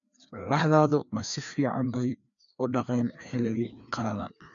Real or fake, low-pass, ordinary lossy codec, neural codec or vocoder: fake; 7.2 kHz; none; codec, 16 kHz, 2 kbps, FreqCodec, larger model